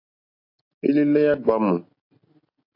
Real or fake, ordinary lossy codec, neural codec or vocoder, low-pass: real; AAC, 32 kbps; none; 5.4 kHz